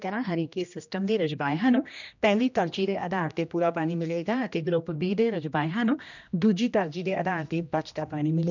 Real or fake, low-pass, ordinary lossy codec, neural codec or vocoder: fake; 7.2 kHz; none; codec, 16 kHz, 1 kbps, X-Codec, HuBERT features, trained on general audio